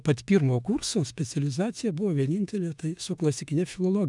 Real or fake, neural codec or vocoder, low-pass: fake; autoencoder, 48 kHz, 32 numbers a frame, DAC-VAE, trained on Japanese speech; 10.8 kHz